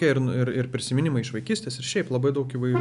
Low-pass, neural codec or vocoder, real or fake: 10.8 kHz; none; real